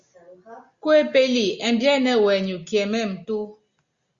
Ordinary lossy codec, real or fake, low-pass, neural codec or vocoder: Opus, 64 kbps; real; 7.2 kHz; none